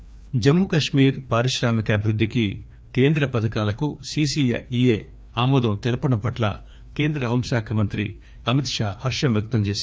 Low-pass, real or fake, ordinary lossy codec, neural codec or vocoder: none; fake; none; codec, 16 kHz, 2 kbps, FreqCodec, larger model